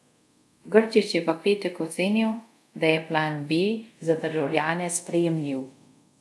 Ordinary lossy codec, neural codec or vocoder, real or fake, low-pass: none; codec, 24 kHz, 0.5 kbps, DualCodec; fake; none